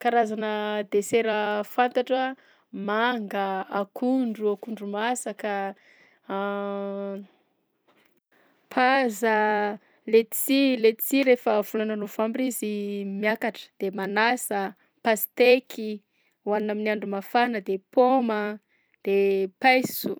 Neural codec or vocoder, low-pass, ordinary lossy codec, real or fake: vocoder, 44.1 kHz, 128 mel bands every 256 samples, BigVGAN v2; none; none; fake